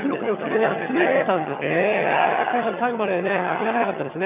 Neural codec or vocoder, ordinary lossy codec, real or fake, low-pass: vocoder, 22.05 kHz, 80 mel bands, HiFi-GAN; none; fake; 3.6 kHz